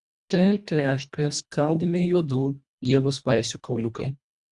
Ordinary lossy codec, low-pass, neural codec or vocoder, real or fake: Opus, 64 kbps; 10.8 kHz; codec, 24 kHz, 1.5 kbps, HILCodec; fake